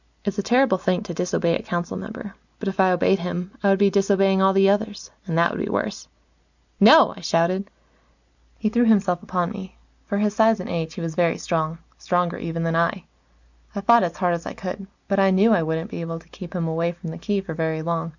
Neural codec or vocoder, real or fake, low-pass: none; real; 7.2 kHz